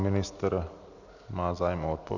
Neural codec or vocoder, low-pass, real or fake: none; 7.2 kHz; real